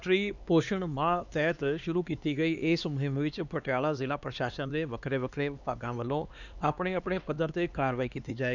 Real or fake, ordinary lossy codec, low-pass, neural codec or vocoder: fake; none; 7.2 kHz; codec, 16 kHz, 2 kbps, X-Codec, HuBERT features, trained on LibriSpeech